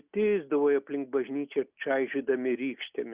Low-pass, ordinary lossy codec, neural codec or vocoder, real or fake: 3.6 kHz; Opus, 64 kbps; none; real